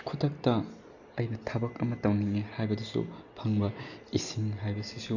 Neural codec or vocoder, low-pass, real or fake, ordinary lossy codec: none; 7.2 kHz; real; none